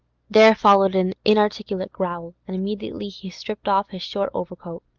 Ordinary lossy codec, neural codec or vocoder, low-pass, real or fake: Opus, 24 kbps; none; 7.2 kHz; real